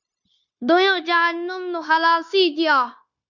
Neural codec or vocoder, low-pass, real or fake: codec, 16 kHz, 0.9 kbps, LongCat-Audio-Codec; 7.2 kHz; fake